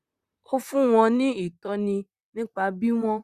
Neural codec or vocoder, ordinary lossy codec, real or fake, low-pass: vocoder, 44.1 kHz, 128 mel bands, Pupu-Vocoder; Opus, 64 kbps; fake; 14.4 kHz